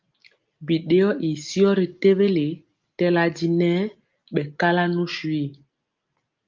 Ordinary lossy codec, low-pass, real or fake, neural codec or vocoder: Opus, 32 kbps; 7.2 kHz; real; none